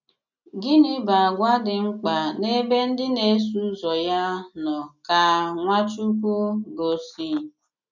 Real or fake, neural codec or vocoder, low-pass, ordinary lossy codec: real; none; 7.2 kHz; none